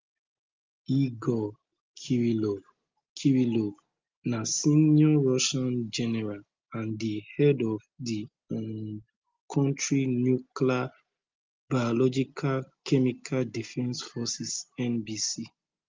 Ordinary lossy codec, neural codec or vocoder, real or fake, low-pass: Opus, 32 kbps; none; real; 7.2 kHz